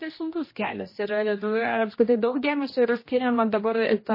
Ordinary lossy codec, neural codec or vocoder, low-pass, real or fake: MP3, 24 kbps; codec, 16 kHz, 1 kbps, X-Codec, HuBERT features, trained on general audio; 5.4 kHz; fake